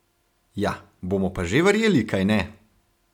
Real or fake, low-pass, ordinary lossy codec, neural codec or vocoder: real; 19.8 kHz; none; none